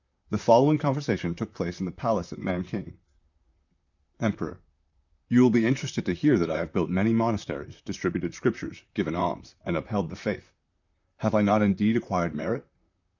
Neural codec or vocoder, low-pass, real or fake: vocoder, 44.1 kHz, 128 mel bands, Pupu-Vocoder; 7.2 kHz; fake